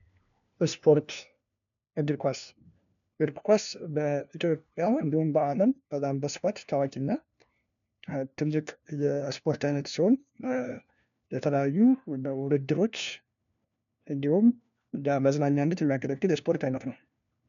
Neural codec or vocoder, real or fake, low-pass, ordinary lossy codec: codec, 16 kHz, 1 kbps, FunCodec, trained on LibriTTS, 50 frames a second; fake; 7.2 kHz; MP3, 96 kbps